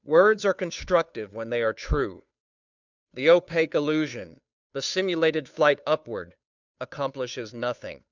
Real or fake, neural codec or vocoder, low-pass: fake; codec, 16 kHz, 2 kbps, FunCodec, trained on Chinese and English, 25 frames a second; 7.2 kHz